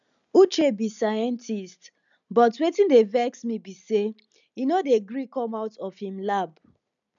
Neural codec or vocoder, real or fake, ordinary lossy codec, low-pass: none; real; none; 7.2 kHz